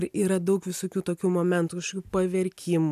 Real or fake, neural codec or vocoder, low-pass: real; none; 14.4 kHz